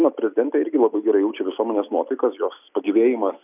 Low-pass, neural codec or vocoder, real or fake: 3.6 kHz; none; real